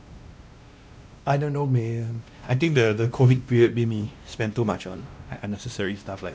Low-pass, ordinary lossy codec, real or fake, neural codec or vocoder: none; none; fake; codec, 16 kHz, 1 kbps, X-Codec, WavLM features, trained on Multilingual LibriSpeech